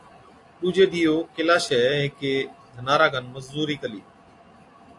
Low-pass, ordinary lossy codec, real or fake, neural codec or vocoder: 10.8 kHz; AAC, 48 kbps; real; none